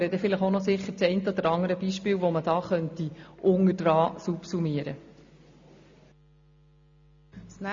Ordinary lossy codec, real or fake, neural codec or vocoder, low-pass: MP3, 48 kbps; real; none; 7.2 kHz